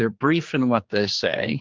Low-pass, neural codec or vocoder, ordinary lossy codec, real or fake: 7.2 kHz; codec, 16 kHz, 2 kbps, X-Codec, HuBERT features, trained on general audio; Opus, 32 kbps; fake